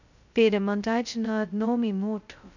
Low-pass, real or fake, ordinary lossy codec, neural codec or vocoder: 7.2 kHz; fake; none; codec, 16 kHz, 0.2 kbps, FocalCodec